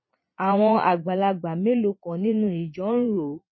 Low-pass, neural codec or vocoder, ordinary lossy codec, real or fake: 7.2 kHz; vocoder, 22.05 kHz, 80 mel bands, Vocos; MP3, 24 kbps; fake